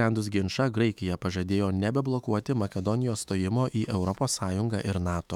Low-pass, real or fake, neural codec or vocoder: 19.8 kHz; fake; autoencoder, 48 kHz, 128 numbers a frame, DAC-VAE, trained on Japanese speech